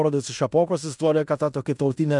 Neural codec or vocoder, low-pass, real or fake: codec, 16 kHz in and 24 kHz out, 0.9 kbps, LongCat-Audio-Codec, fine tuned four codebook decoder; 10.8 kHz; fake